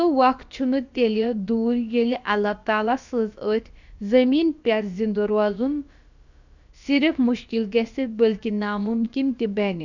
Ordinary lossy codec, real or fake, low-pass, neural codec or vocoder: none; fake; 7.2 kHz; codec, 16 kHz, about 1 kbps, DyCAST, with the encoder's durations